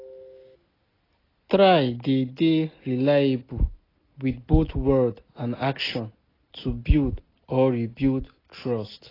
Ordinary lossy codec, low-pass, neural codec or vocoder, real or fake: AAC, 24 kbps; 5.4 kHz; none; real